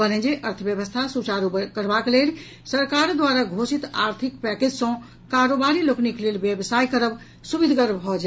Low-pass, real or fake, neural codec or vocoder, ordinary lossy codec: none; real; none; none